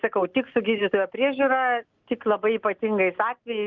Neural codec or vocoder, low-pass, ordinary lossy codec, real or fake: none; 7.2 kHz; Opus, 24 kbps; real